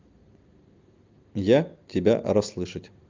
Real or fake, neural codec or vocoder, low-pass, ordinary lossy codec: real; none; 7.2 kHz; Opus, 24 kbps